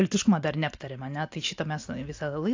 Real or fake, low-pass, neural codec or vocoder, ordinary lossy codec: real; 7.2 kHz; none; AAC, 48 kbps